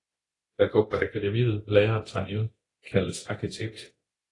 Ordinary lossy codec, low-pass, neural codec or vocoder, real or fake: AAC, 32 kbps; 10.8 kHz; codec, 24 kHz, 0.9 kbps, DualCodec; fake